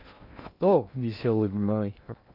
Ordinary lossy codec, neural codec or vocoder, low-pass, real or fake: none; codec, 16 kHz in and 24 kHz out, 0.6 kbps, FocalCodec, streaming, 2048 codes; 5.4 kHz; fake